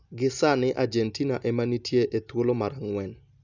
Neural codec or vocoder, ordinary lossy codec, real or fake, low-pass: none; none; real; 7.2 kHz